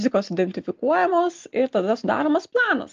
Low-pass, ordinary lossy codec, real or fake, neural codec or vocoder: 7.2 kHz; Opus, 32 kbps; real; none